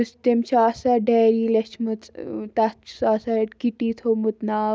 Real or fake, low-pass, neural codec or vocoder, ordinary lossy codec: real; none; none; none